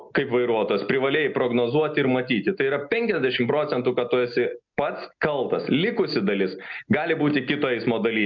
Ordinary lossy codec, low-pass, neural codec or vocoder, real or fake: MP3, 64 kbps; 7.2 kHz; none; real